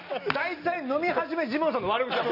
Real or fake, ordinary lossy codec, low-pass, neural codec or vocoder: real; none; 5.4 kHz; none